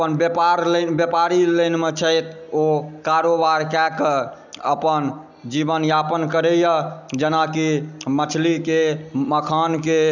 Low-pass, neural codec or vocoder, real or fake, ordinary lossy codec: 7.2 kHz; none; real; none